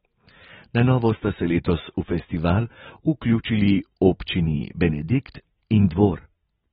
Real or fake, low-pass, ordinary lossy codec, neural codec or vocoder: real; 19.8 kHz; AAC, 16 kbps; none